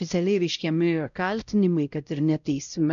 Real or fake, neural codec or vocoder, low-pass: fake; codec, 16 kHz, 0.5 kbps, X-Codec, WavLM features, trained on Multilingual LibriSpeech; 7.2 kHz